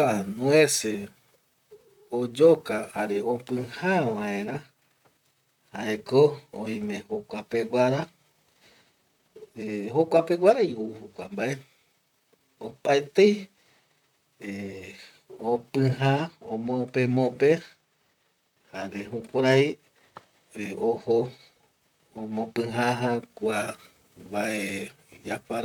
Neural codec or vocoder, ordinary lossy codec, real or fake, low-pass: vocoder, 44.1 kHz, 128 mel bands, Pupu-Vocoder; none; fake; 19.8 kHz